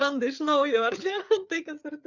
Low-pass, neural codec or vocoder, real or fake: 7.2 kHz; codec, 16 kHz, 4 kbps, FreqCodec, larger model; fake